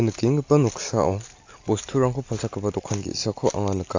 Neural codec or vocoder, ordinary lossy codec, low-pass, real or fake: none; AAC, 48 kbps; 7.2 kHz; real